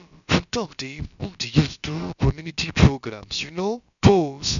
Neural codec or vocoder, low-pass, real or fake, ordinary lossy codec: codec, 16 kHz, about 1 kbps, DyCAST, with the encoder's durations; 7.2 kHz; fake; none